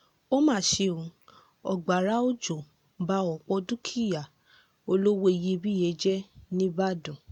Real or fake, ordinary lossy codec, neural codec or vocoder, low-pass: real; none; none; 19.8 kHz